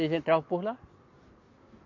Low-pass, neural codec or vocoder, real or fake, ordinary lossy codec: 7.2 kHz; none; real; AAC, 48 kbps